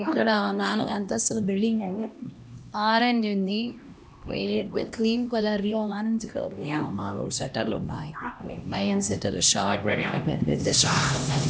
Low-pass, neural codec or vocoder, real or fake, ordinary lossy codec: none; codec, 16 kHz, 1 kbps, X-Codec, HuBERT features, trained on LibriSpeech; fake; none